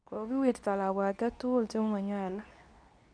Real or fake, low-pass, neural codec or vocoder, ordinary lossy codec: fake; 9.9 kHz; codec, 24 kHz, 0.9 kbps, WavTokenizer, medium speech release version 2; none